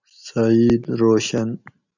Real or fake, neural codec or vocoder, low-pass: real; none; 7.2 kHz